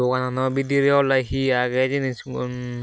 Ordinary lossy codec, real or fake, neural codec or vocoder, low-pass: none; real; none; none